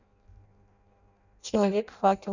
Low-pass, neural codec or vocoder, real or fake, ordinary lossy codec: 7.2 kHz; codec, 16 kHz in and 24 kHz out, 0.6 kbps, FireRedTTS-2 codec; fake; none